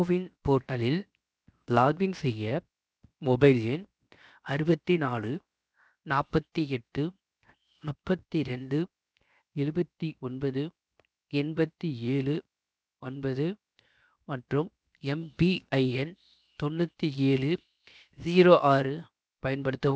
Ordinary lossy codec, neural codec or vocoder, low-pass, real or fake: none; codec, 16 kHz, 0.7 kbps, FocalCodec; none; fake